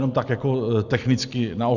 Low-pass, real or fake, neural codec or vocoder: 7.2 kHz; real; none